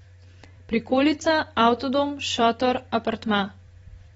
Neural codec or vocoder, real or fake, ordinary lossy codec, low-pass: vocoder, 44.1 kHz, 128 mel bands every 256 samples, BigVGAN v2; fake; AAC, 24 kbps; 19.8 kHz